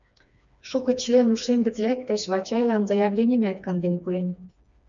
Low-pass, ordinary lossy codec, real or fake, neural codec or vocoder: 7.2 kHz; MP3, 96 kbps; fake; codec, 16 kHz, 2 kbps, FreqCodec, smaller model